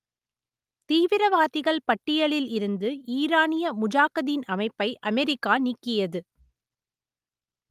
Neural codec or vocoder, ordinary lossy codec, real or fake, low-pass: none; Opus, 32 kbps; real; 14.4 kHz